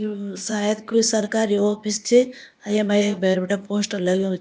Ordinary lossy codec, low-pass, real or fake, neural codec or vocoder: none; none; fake; codec, 16 kHz, 0.8 kbps, ZipCodec